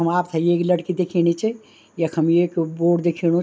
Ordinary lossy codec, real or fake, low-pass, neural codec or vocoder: none; real; none; none